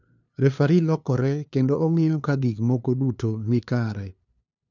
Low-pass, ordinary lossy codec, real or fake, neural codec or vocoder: 7.2 kHz; none; fake; codec, 16 kHz, 2 kbps, FunCodec, trained on LibriTTS, 25 frames a second